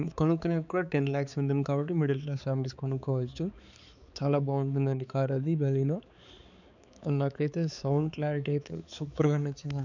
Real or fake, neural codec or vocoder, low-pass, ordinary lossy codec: fake; codec, 16 kHz, 4 kbps, X-Codec, HuBERT features, trained on balanced general audio; 7.2 kHz; none